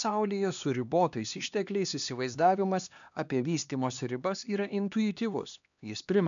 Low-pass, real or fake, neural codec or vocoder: 7.2 kHz; fake; codec, 16 kHz, 2 kbps, X-Codec, HuBERT features, trained on LibriSpeech